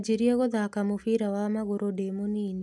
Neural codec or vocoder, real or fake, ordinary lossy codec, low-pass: none; real; none; none